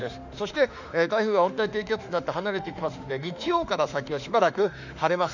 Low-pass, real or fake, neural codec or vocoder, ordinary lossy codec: 7.2 kHz; fake; autoencoder, 48 kHz, 32 numbers a frame, DAC-VAE, trained on Japanese speech; none